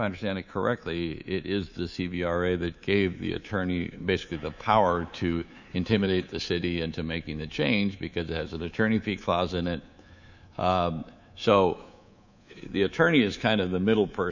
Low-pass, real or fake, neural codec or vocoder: 7.2 kHz; fake; codec, 24 kHz, 3.1 kbps, DualCodec